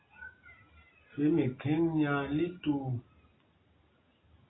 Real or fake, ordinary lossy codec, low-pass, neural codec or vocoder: real; AAC, 16 kbps; 7.2 kHz; none